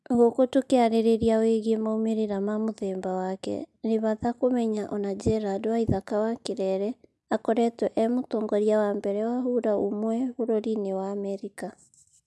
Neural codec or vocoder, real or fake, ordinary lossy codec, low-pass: none; real; none; none